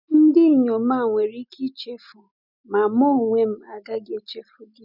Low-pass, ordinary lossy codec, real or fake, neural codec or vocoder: 5.4 kHz; none; real; none